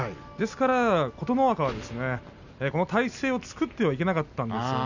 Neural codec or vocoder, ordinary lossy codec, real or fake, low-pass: none; none; real; 7.2 kHz